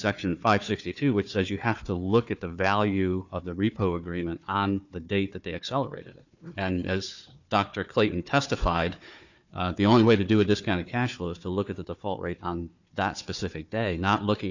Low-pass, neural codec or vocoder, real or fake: 7.2 kHz; codec, 16 kHz, 4 kbps, FunCodec, trained on Chinese and English, 50 frames a second; fake